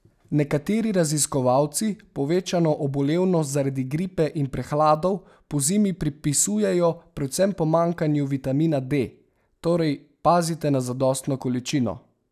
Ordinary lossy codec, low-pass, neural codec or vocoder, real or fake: none; 14.4 kHz; none; real